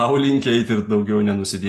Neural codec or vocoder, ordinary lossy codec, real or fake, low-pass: none; AAC, 48 kbps; real; 14.4 kHz